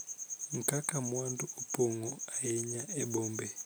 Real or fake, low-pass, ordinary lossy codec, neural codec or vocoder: real; none; none; none